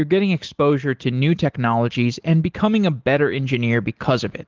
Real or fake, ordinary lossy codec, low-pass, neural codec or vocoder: real; Opus, 16 kbps; 7.2 kHz; none